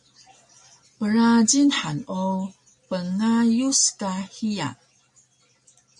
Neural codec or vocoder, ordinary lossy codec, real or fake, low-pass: none; MP3, 48 kbps; real; 10.8 kHz